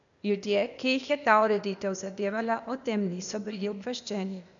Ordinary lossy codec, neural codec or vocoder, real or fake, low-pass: none; codec, 16 kHz, 0.8 kbps, ZipCodec; fake; 7.2 kHz